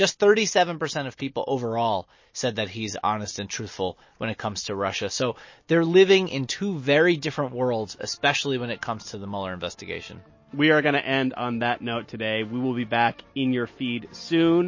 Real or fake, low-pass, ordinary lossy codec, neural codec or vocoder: real; 7.2 kHz; MP3, 32 kbps; none